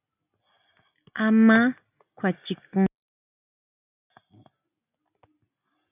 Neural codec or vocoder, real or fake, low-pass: none; real; 3.6 kHz